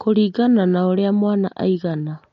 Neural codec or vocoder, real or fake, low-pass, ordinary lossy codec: none; real; 7.2 kHz; MP3, 48 kbps